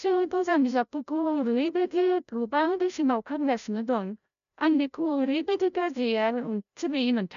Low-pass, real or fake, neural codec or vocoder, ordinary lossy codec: 7.2 kHz; fake; codec, 16 kHz, 0.5 kbps, FreqCodec, larger model; none